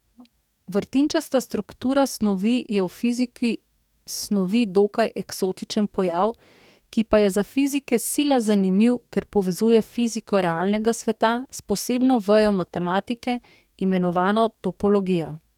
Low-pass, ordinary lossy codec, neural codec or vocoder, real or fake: 19.8 kHz; none; codec, 44.1 kHz, 2.6 kbps, DAC; fake